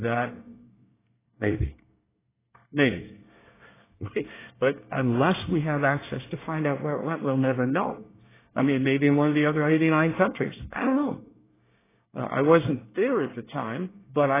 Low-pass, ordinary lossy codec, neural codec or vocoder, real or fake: 3.6 kHz; AAC, 16 kbps; codec, 24 kHz, 1 kbps, SNAC; fake